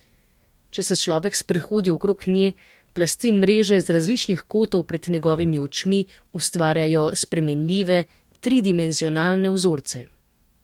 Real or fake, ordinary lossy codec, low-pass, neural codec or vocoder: fake; MP3, 96 kbps; 19.8 kHz; codec, 44.1 kHz, 2.6 kbps, DAC